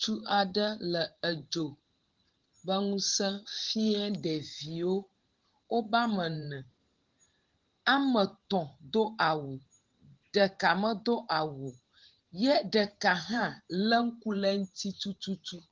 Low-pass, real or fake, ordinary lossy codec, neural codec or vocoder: 7.2 kHz; fake; Opus, 16 kbps; vocoder, 44.1 kHz, 128 mel bands every 512 samples, BigVGAN v2